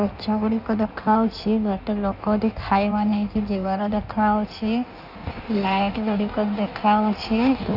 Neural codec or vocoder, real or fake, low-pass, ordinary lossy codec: codec, 16 kHz in and 24 kHz out, 1.1 kbps, FireRedTTS-2 codec; fake; 5.4 kHz; none